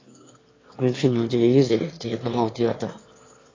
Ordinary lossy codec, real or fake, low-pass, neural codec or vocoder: AAC, 32 kbps; fake; 7.2 kHz; autoencoder, 22.05 kHz, a latent of 192 numbers a frame, VITS, trained on one speaker